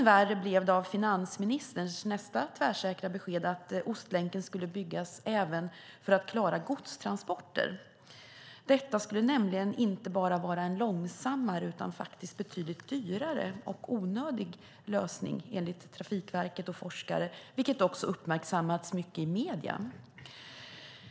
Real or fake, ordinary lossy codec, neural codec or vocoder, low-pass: real; none; none; none